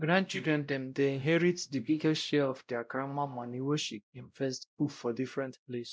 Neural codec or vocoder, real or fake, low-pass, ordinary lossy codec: codec, 16 kHz, 0.5 kbps, X-Codec, WavLM features, trained on Multilingual LibriSpeech; fake; none; none